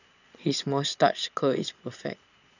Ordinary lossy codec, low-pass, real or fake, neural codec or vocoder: none; 7.2 kHz; fake; vocoder, 22.05 kHz, 80 mel bands, Vocos